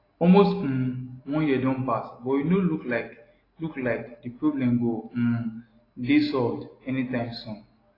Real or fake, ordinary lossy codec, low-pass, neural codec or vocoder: real; AAC, 24 kbps; 5.4 kHz; none